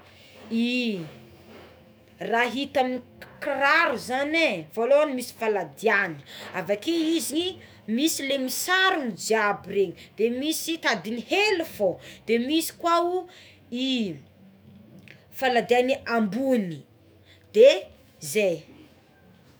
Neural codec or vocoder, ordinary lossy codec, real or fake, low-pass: autoencoder, 48 kHz, 128 numbers a frame, DAC-VAE, trained on Japanese speech; none; fake; none